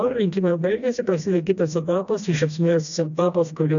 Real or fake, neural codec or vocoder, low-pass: fake; codec, 16 kHz, 1 kbps, FreqCodec, smaller model; 7.2 kHz